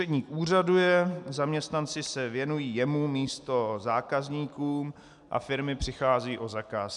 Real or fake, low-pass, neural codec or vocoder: real; 10.8 kHz; none